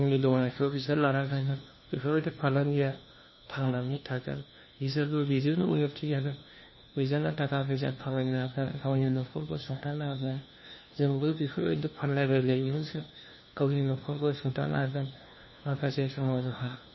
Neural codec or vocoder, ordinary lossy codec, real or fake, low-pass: codec, 16 kHz, 1 kbps, FunCodec, trained on LibriTTS, 50 frames a second; MP3, 24 kbps; fake; 7.2 kHz